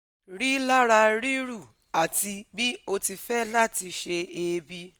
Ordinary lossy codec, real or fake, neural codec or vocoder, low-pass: none; real; none; none